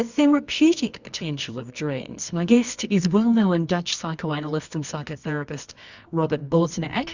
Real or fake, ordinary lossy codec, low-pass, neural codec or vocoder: fake; Opus, 64 kbps; 7.2 kHz; codec, 24 kHz, 0.9 kbps, WavTokenizer, medium music audio release